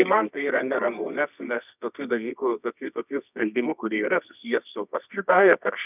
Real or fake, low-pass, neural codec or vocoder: fake; 3.6 kHz; codec, 24 kHz, 0.9 kbps, WavTokenizer, medium music audio release